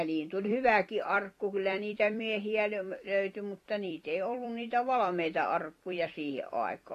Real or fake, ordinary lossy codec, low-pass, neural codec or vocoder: fake; AAC, 48 kbps; 14.4 kHz; vocoder, 44.1 kHz, 128 mel bands every 256 samples, BigVGAN v2